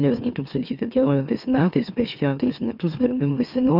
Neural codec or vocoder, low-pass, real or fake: autoencoder, 44.1 kHz, a latent of 192 numbers a frame, MeloTTS; 5.4 kHz; fake